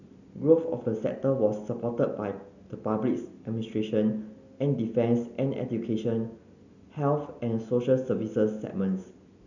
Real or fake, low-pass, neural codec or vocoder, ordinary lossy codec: real; 7.2 kHz; none; none